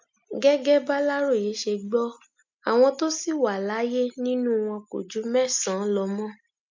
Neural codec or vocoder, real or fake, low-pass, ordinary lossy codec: none; real; 7.2 kHz; MP3, 64 kbps